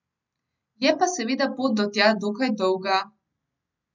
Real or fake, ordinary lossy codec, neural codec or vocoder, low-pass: real; none; none; 7.2 kHz